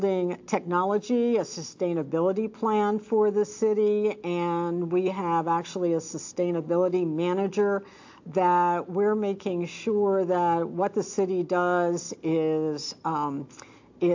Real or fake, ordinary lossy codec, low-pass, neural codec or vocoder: real; AAC, 48 kbps; 7.2 kHz; none